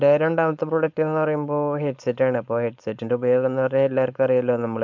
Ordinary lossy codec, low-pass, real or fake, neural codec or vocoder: MP3, 64 kbps; 7.2 kHz; fake; codec, 16 kHz, 4.8 kbps, FACodec